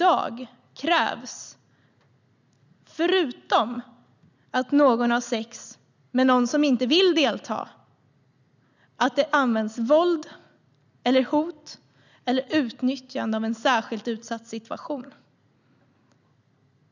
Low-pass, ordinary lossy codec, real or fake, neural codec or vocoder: 7.2 kHz; none; real; none